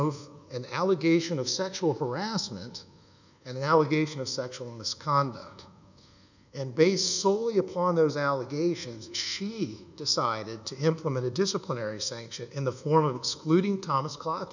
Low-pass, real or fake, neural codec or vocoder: 7.2 kHz; fake; codec, 24 kHz, 1.2 kbps, DualCodec